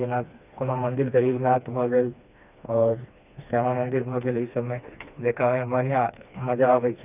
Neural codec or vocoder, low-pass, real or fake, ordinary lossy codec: codec, 16 kHz, 2 kbps, FreqCodec, smaller model; 3.6 kHz; fake; none